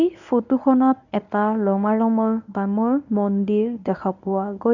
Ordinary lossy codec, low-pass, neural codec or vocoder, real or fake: none; 7.2 kHz; codec, 24 kHz, 0.9 kbps, WavTokenizer, medium speech release version 1; fake